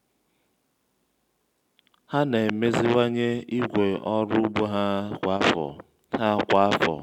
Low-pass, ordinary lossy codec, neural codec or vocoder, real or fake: 19.8 kHz; none; none; real